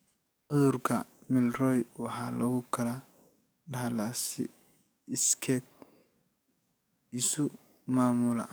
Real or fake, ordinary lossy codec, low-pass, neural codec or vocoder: fake; none; none; codec, 44.1 kHz, 7.8 kbps, DAC